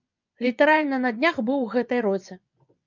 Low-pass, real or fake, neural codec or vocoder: 7.2 kHz; fake; vocoder, 24 kHz, 100 mel bands, Vocos